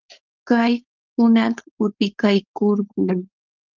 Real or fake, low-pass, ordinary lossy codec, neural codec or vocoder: fake; 7.2 kHz; Opus, 32 kbps; codec, 16 kHz, 4.8 kbps, FACodec